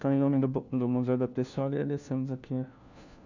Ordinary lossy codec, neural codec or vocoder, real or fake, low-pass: none; codec, 16 kHz, 1 kbps, FunCodec, trained on LibriTTS, 50 frames a second; fake; 7.2 kHz